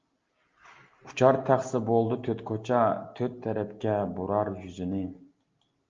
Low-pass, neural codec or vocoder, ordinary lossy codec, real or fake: 7.2 kHz; none; Opus, 32 kbps; real